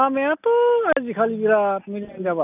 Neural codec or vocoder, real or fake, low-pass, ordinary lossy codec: none; real; 3.6 kHz; none